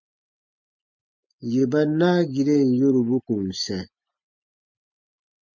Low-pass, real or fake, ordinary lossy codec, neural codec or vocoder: 7.2 kHz; real; MP3, 48 kbps; none